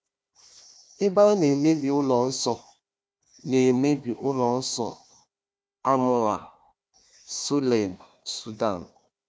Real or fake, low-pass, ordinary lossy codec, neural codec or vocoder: fake; none; none; codec, 16 kHz, 1 kbps, FunCodec, trained on Chinese and English, 50 frames a second